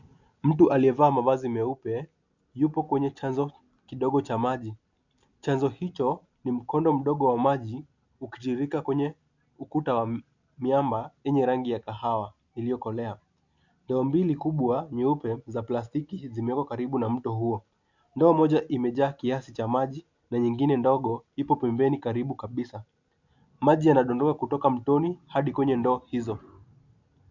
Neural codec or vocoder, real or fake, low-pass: none; real; 7.2 kHz